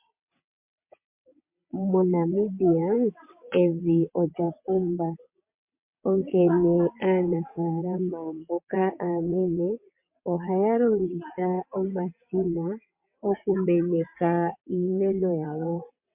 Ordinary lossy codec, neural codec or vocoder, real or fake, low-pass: MP3, 32 kbps; vocoder, 22.05 kHz, 80 mel bands, WaveNeXt; fake; 3.6 kHz